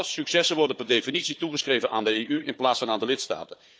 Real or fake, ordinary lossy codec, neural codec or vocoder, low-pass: fake; none; codec, 16 kHz, 4 kbps, FunCodec, trained on Chinese and English, 50 frames a second; none